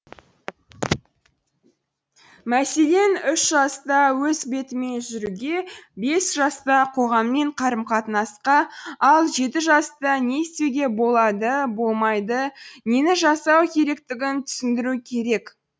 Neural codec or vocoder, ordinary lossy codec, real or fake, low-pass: none; none; real; none